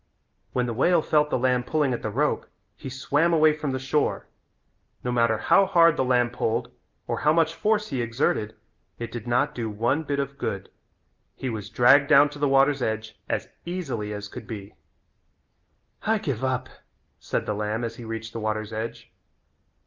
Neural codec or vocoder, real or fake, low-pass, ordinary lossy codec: none; real; 7.2 kHz; Opus, 32 kbps